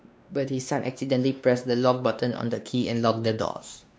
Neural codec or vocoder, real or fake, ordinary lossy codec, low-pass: codec, 16 kHz, 2 kbps, X-Codec, WavLM features, trained on Multilingual LibriSpeech; fake; none; none